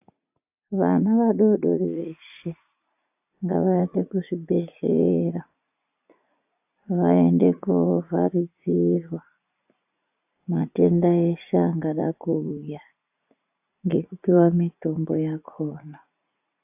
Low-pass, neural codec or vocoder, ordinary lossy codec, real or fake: 3.6 kHz; vocoder, 44.1 kHz, 80 mel bands, Vocos; AAC, 32 kbps; fake